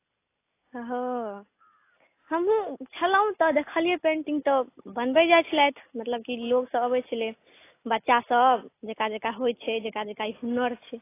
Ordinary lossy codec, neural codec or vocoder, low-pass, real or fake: AAC, 24 kbps; none; 3.6 kHz; real